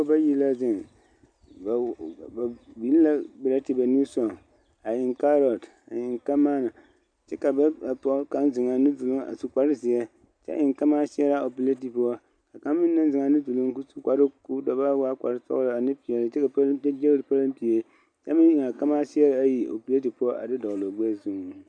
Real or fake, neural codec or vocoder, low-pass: real; none; 9.9 kHz